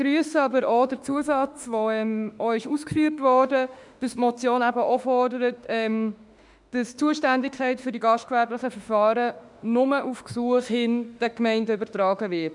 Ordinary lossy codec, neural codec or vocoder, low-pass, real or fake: none; autoencoder, 48 kHz, 32 numbers a frame, DAC-VAE, trained on Japanese speech; 10.8 kHz; fake